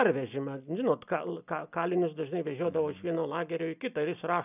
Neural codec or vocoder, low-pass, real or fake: none; 3.6 kHz; real